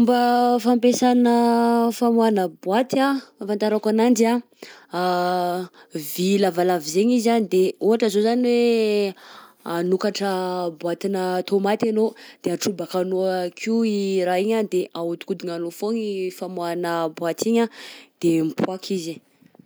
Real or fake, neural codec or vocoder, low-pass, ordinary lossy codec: real; none; none; none